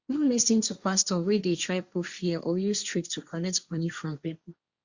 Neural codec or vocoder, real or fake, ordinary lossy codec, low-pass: codec, 16 kHz, 1.1 kbps, Voila-Tokenizer; fake; Opus, 64 kbps; 7.2 kHz